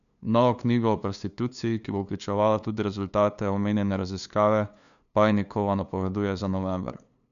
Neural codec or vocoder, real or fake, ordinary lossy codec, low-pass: codec, 16 kHz, 2 kbps, FunCodec, trained on LibriTTS, 25 frames a second; fake; none; 7.2 kHz